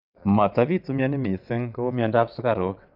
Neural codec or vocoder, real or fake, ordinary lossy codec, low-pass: vocoder, 22.05 kHz, 80 mel bands, WaveNeXt; fake; none; 5.4 kHz